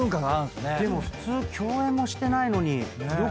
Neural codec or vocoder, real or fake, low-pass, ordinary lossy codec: none; real; none; none